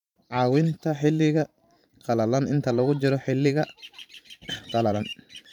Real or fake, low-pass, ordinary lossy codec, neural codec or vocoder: fake; 19.8 kHz; none; vocoder, 44.1 kHz, 128 mel bands every 512 samples, BigVGAN v2